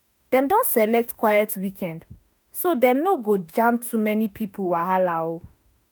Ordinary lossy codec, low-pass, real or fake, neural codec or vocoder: none; none; fake; autoencoder, 48 kHz, 32 numbers a frame, DAC-VAE, trained on Japanese speech